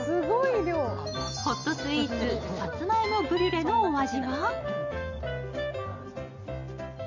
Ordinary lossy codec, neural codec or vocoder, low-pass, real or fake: none; none; 7.2 kHz; real